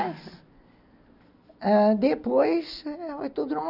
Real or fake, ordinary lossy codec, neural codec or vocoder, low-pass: real; none; none; 5.4 kHz